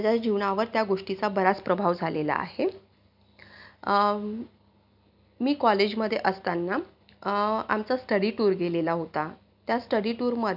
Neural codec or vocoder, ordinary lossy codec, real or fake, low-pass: none; none; real; 5.4 kHz